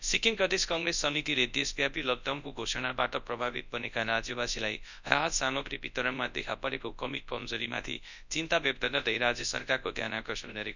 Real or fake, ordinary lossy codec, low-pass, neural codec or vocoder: fake; none; 7.2 kHz; codec, 24 kHz, 0.9 kbps, WavTokenizer, large speech release